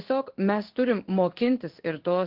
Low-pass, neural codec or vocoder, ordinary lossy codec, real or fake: 5.4 kHz; codec, 16 kHz in and 24 kHz out, 1 kbps, XY-Tokenizer; Opus, 16 kbps; fake